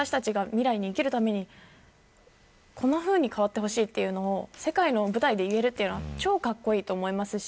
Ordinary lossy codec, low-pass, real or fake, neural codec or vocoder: none; none; real; none